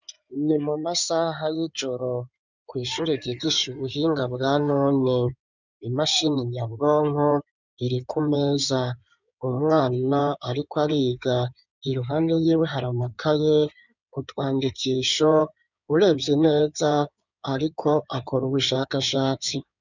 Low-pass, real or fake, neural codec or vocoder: 7.2 kHz; fake; codec, 16 kHz in and 24 kHz out, 2.2 kbps, FireRedTTS-2 codec